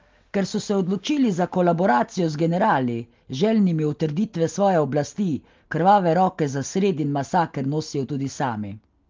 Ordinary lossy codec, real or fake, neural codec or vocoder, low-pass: Opus, 32 kbps; real; none; 7.2 kHz